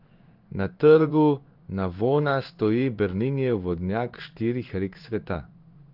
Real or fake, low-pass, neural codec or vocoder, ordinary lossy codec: fake; 5.4 kHz; codec, 16 kHz in and 24 kHz out, 1 kbps, XY-Tokenizer; Opus, 32 kbps